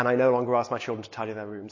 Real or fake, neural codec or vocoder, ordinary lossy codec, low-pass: real; none; MP3, 32 kbps; 7.2 kHz